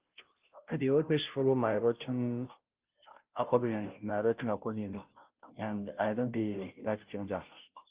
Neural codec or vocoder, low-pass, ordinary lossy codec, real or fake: codec, 16 kHz, 0.5 kbps, FunCodec, trained on Chinese and English, 25 frames a second; 3.6 kHz; Opus, 24 kbps; fake